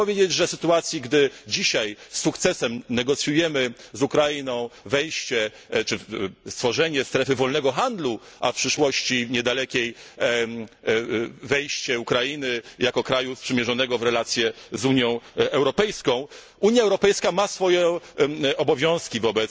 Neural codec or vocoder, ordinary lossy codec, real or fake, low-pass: none; none; real; none